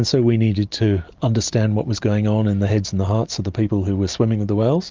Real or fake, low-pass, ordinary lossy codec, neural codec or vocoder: real; 7.2 kHz; Opus, 24 kbps; none